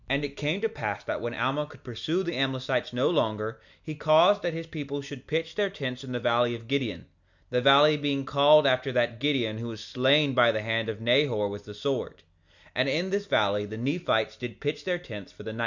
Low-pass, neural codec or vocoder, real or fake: 7.2 kHz; none; real